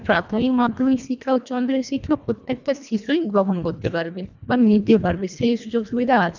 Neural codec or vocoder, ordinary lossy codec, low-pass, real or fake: codec, 24 kHz, 1.5 kbps, HILCodec; none; 7.2 kHz; fake